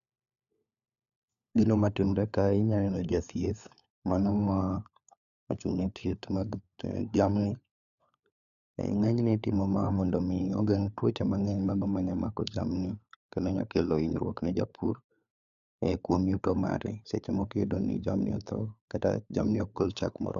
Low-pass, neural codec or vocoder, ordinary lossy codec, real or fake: 7.2 kHz; codec, 16 kHz, 4 kbps, FunCodec, trained on LibriTTS, 50 frames a second; MP3, 96 kbps; fake